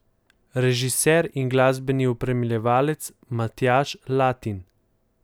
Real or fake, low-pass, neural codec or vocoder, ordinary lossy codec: real; none; none; none